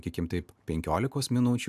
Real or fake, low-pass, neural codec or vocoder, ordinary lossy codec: real; 14.4 kHz; none; AAC, 96 kbps